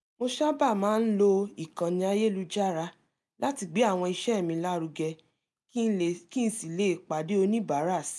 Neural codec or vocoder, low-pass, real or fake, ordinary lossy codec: none; none; real; none